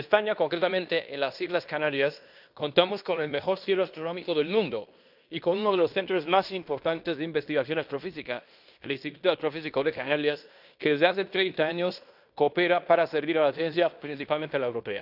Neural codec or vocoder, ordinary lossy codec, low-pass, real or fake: codec, 16 kHz in and 24 kHz out, 0.9 kbps, LongCat-Audio-Codec, fine tuned four codebook decoder; none; 5.4 kHz; fake